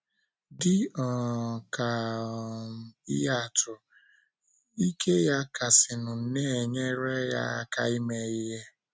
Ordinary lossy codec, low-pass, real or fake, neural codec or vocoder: none; none; real; none